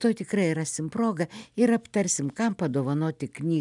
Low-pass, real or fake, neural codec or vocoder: 10.8 kHz; real; none